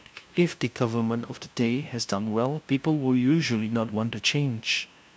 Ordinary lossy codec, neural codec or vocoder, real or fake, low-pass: none; codec, 16 kHz, 0.5 kbps, FunCodec, trained on LibriTTS, 25 frames a second; fake; none